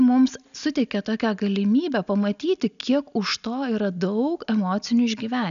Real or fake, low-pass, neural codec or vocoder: real; 7.2 kHz; none